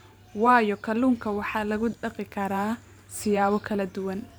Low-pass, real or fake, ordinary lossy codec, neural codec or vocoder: none; fake; none; vocoder, 44.1 kHz, 128 mel bands every 512 samples, BigVGAN v2